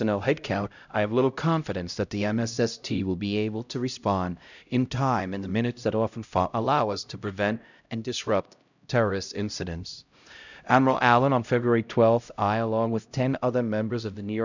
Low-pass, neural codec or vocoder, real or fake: 7.2 kHz; codec, 16 kHz, 0.5 kbps, X-Codec, HuBERT features, trained on LibriSpeech; fake